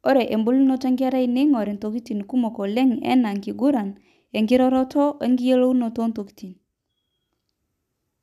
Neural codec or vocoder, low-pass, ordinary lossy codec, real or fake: none; 14.4 kHz; none; real